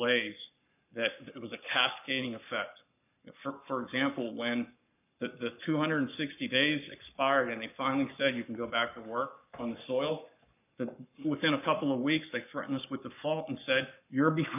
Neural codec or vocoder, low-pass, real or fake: codec, 44.1 kHz, 7.8 kbps, Pupu-Codec; 3.6 kHz; fake